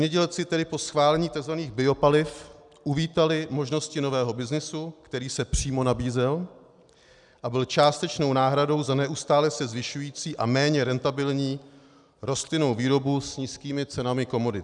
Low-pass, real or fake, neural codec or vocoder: 10.8 kHz; real; none